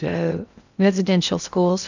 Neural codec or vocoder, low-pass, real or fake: codec, 16 kHz in and 24 kHz out, 0.8 kbps, FocalCodec, streaming, 65536 codes; 7.2 kHz; fake